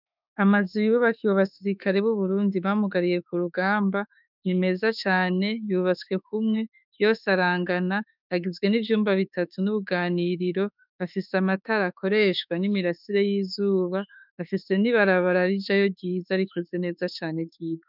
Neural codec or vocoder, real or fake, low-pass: autoencoder, 48 kHz, 32 numbers a frame, DAC-VAE, trained on Japanese speech; fake; 5.4 kHz